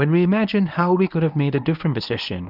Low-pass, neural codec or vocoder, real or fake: 5.4 kHz; codec, 24 kHz, 0.9 kbps, WavTokenizer, medium speech release version 1; fake